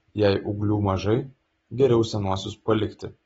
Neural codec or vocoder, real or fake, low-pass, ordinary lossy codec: none; real; 19.8 kHz; AAC, 24 kbps